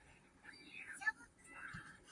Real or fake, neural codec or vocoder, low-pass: fake; vocoder, 44.1 kHz, 128 mel bands, Pupu-Vocoder; 10.8 kHz